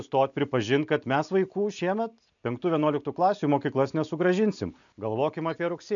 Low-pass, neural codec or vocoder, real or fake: 7.2 kHz; none; real